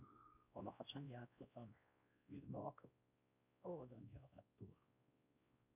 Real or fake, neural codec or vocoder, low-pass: fake; codec, 16 kHz, 1 kbps, X-Codec, WavLM features, trained on Multilingual LibriSpeech; 3.6 kHz